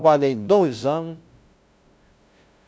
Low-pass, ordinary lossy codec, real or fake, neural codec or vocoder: none; none; fake; codec, 16 kHz, 0.5 kbps, FunCodec, trained on LibriTTS, 25 frames a second